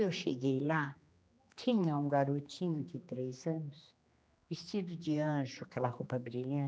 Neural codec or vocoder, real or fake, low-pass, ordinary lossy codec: codec, 16 kHz, 2 kbps, X-Codec, HuBERT features, trained on general audio; fake; none; none